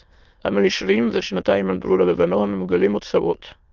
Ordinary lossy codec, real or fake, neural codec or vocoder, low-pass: Opus, 24 kbps; fake; autoencoder, 22.05 kHz, a latent of 192 numbers a frame, VITS, trained on many speakers; 7.2 kHz